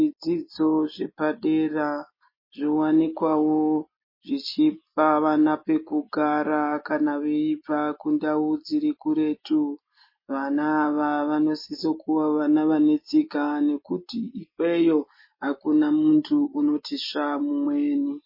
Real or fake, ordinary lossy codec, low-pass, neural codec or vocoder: real; MP3, 24 kbps; 5.4 kHz; none